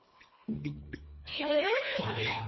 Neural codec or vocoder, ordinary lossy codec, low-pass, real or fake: codec, 24 kHz, 1.5 kbps, HILCodec; MP3, 24 kbps; 7.2 kHz; fake